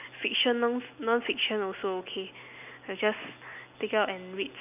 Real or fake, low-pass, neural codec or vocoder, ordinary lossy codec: real; 3.6 kHz; none; none